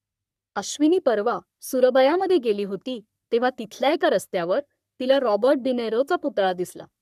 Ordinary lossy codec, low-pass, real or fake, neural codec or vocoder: none; 14.4 kHz; fake; codec, 44.1 kHz, 3.4 kbps, Pupu-Codec